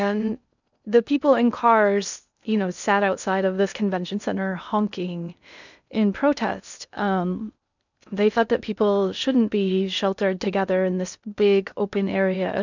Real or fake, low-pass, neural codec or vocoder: fake; 7.2 kHz; codec, 16 kHz in and 24 kHz out, 0.6 kbps, FocalCodec, streaming, 2048 codes